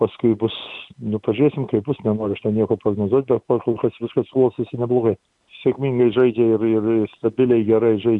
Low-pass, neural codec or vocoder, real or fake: 10.8 kHz; none; real